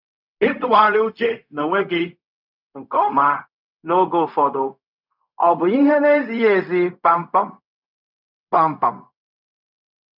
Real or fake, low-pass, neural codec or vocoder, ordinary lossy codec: fake; 5.4 kHz; codec, 16 kHz, 0.4 kbps, LongCat-Audio-Codec; none